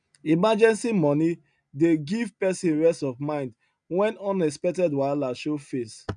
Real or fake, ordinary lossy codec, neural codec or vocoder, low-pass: real; none; none; 9.9 kHz